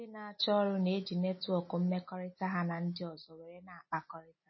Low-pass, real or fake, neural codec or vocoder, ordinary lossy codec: 7.2 kHz; real; none; MP3, 24 kbps